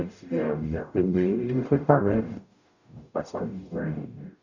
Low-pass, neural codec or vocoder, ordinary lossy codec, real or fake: 7.2 kHz; codec, 44.1 kHz, 0.9 kbps, DAC; none; fake